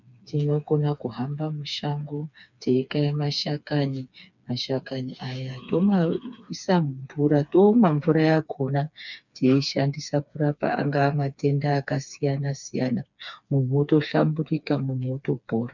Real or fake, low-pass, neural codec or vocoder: fake; 7.2 kHz; codec, 16 kHz, 4 kbps, FreqCodec, smaller model